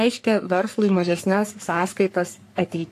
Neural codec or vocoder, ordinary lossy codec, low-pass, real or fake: codec, 44.1 kHz, 3.4 kbps, Pupu-Codec; AAC, 64 kbps; 14.4 kHz; fake